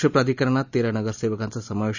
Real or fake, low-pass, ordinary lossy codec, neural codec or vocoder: real; 7.2 kHz; none; none